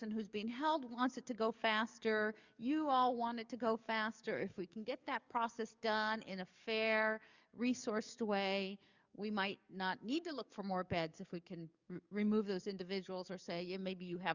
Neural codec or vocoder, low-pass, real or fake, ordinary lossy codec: codec, 44.1 kHz, 7.8 kbps, DAC; 7.2 kHz; fake; Opus, 64 kbps